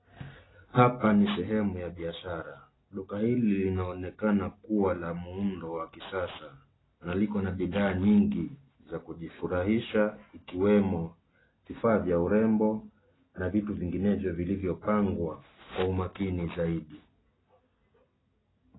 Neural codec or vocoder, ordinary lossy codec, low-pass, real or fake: none; AAC, 16 kbps; 7.2 kHz; real